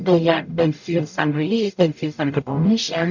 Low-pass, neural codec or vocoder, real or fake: 7.2 kHz; codec, 44.1 kHz, 0.9 kbps, DAC; fake